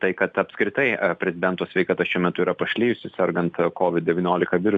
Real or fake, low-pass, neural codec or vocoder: real; 9.9 kHz; none